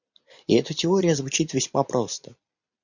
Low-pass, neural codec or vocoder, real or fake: 7.2 kHz; vocoder, 44.1 kHz, 128 mel bands every 256 samples, BigVGAN v2; fake